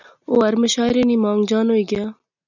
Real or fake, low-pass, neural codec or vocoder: real; 7.2 kHz; none